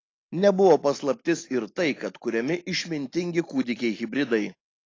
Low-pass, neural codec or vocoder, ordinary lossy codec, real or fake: 7.2 kHz; none; AAC, 32 kbps; real